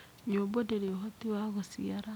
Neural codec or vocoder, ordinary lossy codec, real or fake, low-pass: none; none; real; none